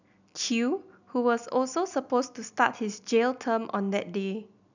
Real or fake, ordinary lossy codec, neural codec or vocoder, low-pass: real; none; none; 7.2 kHz